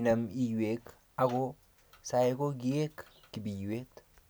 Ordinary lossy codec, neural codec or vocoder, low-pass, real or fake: none; none; none; real